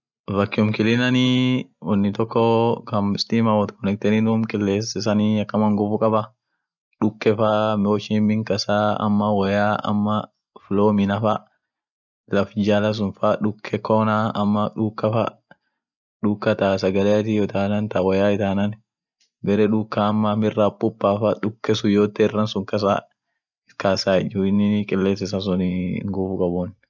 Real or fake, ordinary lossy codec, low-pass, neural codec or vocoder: real; none; 7.2 kHz; none